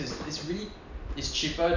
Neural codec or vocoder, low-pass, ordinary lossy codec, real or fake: none; 7.2 kHz; none; real